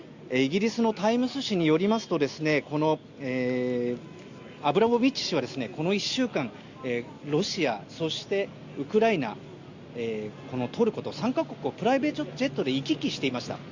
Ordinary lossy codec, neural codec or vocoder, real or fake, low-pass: Opus, 64 kbps; none; real; 7.2 kHz